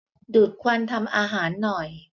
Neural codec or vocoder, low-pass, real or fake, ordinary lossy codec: none; 7.2 kHz; real; MP3, 64 kbps